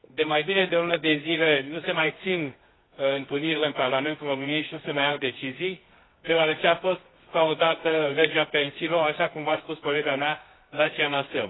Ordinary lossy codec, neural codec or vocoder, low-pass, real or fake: AAC, 16 kbps; codec, 24 kHz, 0.9 kbps, WavTokenizer, medium music audio release; 7.2 kHz; fake